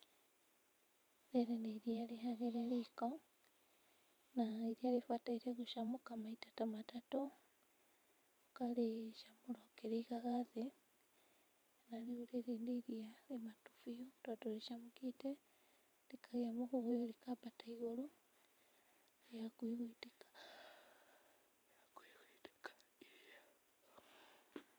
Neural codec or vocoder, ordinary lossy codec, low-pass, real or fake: vocoder, 44.1 kHz, 128 mel bands every 512 samples, BigVGAN v2; none; none; fake